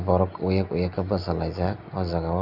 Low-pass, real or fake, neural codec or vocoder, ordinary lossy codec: 5.4 kHz; real; none; AAC, 48 kbps